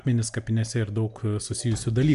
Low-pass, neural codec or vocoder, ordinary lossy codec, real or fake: 10.8 kHz; none; MP3, 96 kbps; real